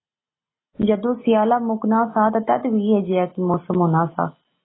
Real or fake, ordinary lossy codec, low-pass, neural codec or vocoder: real; AAC, 16 kbps; 7.2 kHz; none